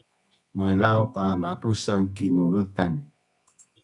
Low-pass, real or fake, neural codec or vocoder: 10.8 kHz; fake; codec, 24 kHz, 0.9 kbps, WavTokenizer, medium music audio release